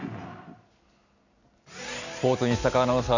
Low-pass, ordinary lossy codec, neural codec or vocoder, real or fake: 7.2 kHz; none; none; real